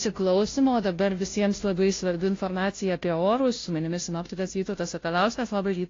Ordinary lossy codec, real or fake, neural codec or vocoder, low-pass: AAC, 32 kbps; fake; codec, 16 kHz, 0.5 kbps, FunCodec, trained on Chinese and English, 25 frames a second; 7.2 kHz